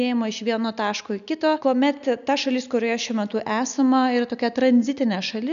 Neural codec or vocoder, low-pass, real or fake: none; 7.2 kHz; real